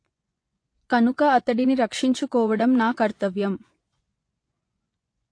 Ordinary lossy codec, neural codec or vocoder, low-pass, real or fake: AAC, 48 kbps; vocoder, 24 kHz, 100 mel bands, Vocos; 9.9 kHz; fake